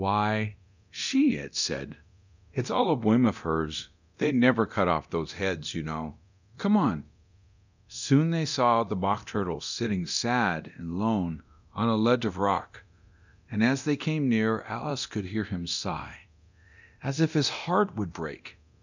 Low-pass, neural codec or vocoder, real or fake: 7.2 kHz; codec, 24 kHz, 0.9 kbps, DualCodec; fake